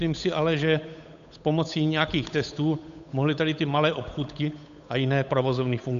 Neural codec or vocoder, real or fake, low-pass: codec, 16 kHz, 8 kbps, FunCodec, trained on Chinese and English, 25 frames a second; fake; 7.2 kHz